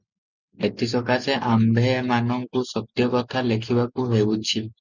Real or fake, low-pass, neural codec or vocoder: real; 7.2 kHz; none